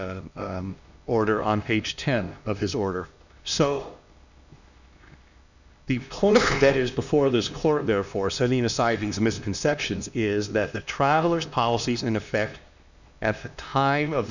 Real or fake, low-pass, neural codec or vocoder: fake; 7.2 kHz; codec, 16 kHz, 2 kbps, X-Codec, HuBERT features, trained on LibriSpeech